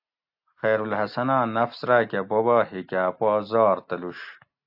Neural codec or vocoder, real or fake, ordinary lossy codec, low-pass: none; real; AAC, 48 kbps; 5.4 kHz